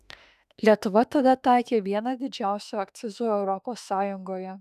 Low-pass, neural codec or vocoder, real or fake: 14.4 kHz; autoencoder, 48 kHz, 32 numbers a frame, DAC-VAE, trained on Japanese speech; fake